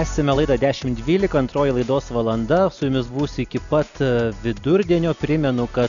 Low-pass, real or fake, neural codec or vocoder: 7.2 kHz; real; none